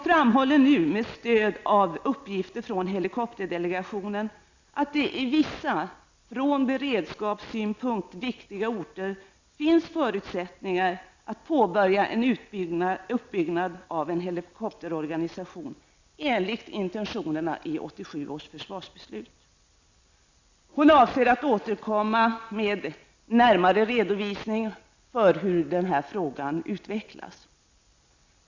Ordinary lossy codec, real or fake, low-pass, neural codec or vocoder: Opus, 64 kbps; real; 7.2 kHz; none